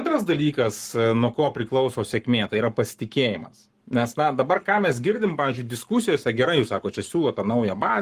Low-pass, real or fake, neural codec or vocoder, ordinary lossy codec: 14.4 kHz; fake; codec, 44.1 kHz, 7.8 kbps, Pupu-Codec; Opus, 24 kbps